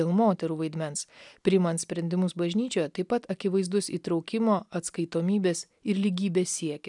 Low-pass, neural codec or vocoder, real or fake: 10.8 kHz; none; real